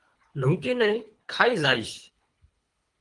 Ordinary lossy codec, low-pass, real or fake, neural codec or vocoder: Opus, 24 kbps; 10.8 kHz; fake; codec, 24 kHz, 3 kbps, HILCodec